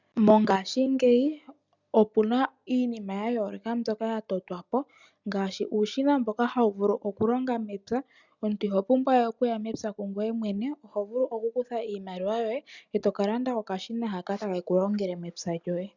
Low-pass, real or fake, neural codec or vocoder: 7.2 kHz; real; none